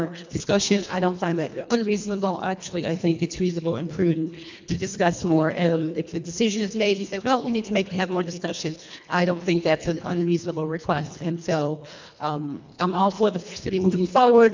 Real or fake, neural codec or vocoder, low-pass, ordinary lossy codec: fake; codec, 24 kHz, 1.5 kbps, HILCodec; 7.2 kHz; MP3, 64 kbps